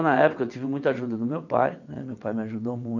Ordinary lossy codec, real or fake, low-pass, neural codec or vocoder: none; fake; 7.2 kHz; vocoder, 22.05 kHz, 80 mel bands, WaveNeXt